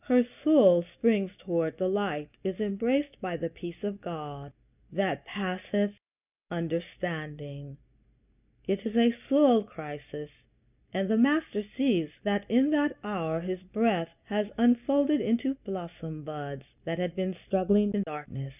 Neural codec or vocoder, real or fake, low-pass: none; real; 3.6 kHz